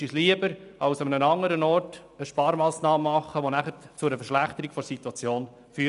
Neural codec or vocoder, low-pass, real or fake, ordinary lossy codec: none; 10.8 kHz; real; none